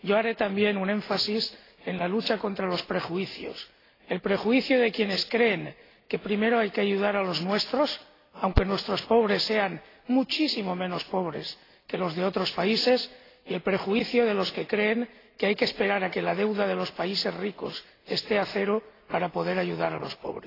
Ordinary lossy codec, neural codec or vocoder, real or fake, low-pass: AAC, 24 kbps; none; real; 5.4 kHz